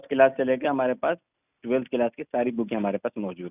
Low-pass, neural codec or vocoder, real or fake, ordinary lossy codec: 3.6 kHz; none; real; none